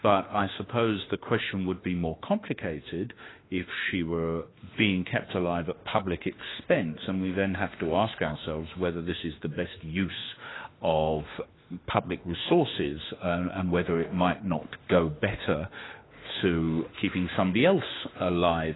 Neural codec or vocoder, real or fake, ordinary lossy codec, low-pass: autoencoder, 48 kHz, 32 numbers a frame, DAC-VAE, trained on Japanese speech; fake; AAC, 16 kbps; 7.2 kHz